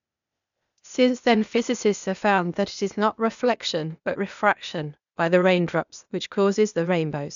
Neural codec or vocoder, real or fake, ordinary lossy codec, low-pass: codec, 16 kHz, 0.8 kbps, ZipCodec; fake; none; 7.2 kHz